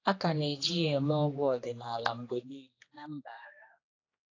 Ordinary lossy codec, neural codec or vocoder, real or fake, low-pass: AAC, 32 kbps; codec, 16 kHz, 2 kbps, X-Codec, HuBERT features, trained on general audio; fake; 7.2 kHz